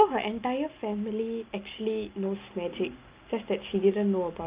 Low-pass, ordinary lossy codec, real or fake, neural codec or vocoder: 3.6 kHz; Opus, 24 kbps; real; none